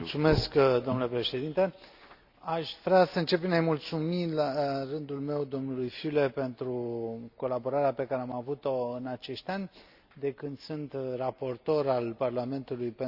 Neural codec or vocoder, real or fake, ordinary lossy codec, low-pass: none; real; Opus, 64 kbps; 5.4 kHz